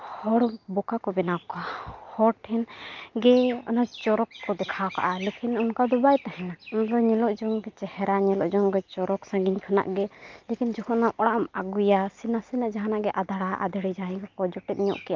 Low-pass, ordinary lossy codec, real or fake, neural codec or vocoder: 7.2 kHz; Opus, 24 kbps; real; none